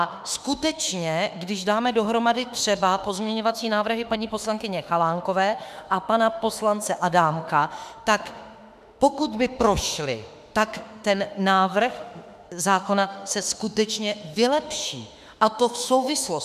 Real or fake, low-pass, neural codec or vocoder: fake; 14.4 kHz; autoencoder, 48 kHz, 32 numbers a frame, DAC-VAE, trained on Japanese speech